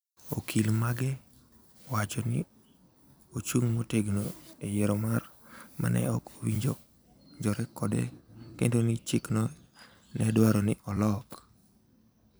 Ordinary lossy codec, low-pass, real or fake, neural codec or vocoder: none; none; fake; vocoder, 44.1 kHz, 128 mel bands every 256 samples, BigVGAN v2